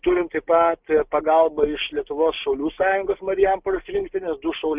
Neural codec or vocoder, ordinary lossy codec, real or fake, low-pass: none; Opus, 24 kbps; real; 3.6 kHz